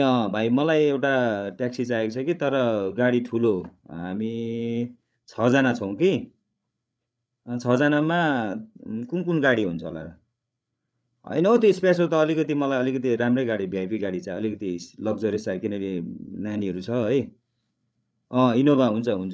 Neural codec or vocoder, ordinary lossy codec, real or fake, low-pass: codec, 16 kHz, 8 kbps, FreqCodec, larger model; none; fake; none